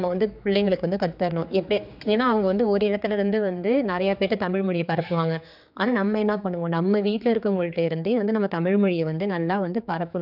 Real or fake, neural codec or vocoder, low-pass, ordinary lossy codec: fake; codec, 16 kHz, 4 kbps, X-Codec, HuBERT features, trained on general audio; 5.4 kHz; none